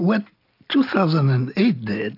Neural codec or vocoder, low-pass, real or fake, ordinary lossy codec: none; 5.4 kHz; real; AAC, 32 kbps